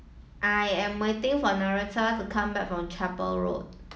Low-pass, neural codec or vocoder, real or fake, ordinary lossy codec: none; none; real; none